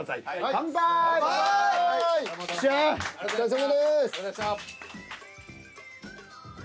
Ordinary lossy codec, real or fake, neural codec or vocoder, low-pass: none; real; none; none